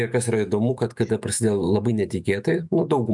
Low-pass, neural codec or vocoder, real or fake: 10.8 kHz; autoencoder, 48 kHz, 128 numbers a frame, DAC-VAE, trained on Japanese speech; fake